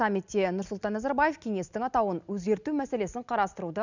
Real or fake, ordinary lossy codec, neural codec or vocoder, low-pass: real; none; none; 7.2 kHz